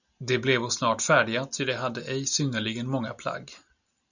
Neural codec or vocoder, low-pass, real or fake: none; 7.2 kHz; real